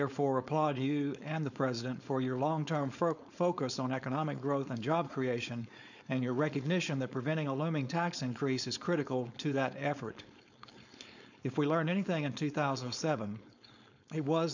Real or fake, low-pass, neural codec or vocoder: fake; 7.2 kHz; codec, 16 kHz, 4.8 kbps, FACodec